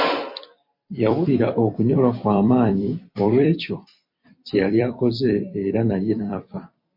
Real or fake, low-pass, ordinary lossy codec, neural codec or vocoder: fake; 5.4 kHz; MP3, 32 kbps; vocoder, 44.1 kHz, 128 mel bands every 256 samples, BigVGAN v2